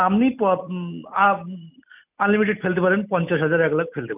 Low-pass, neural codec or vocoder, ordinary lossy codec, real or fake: 3.6 kHz; none; none; real